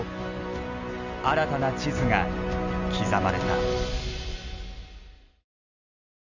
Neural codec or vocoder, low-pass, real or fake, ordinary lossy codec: none; 7.2 kHz; real; none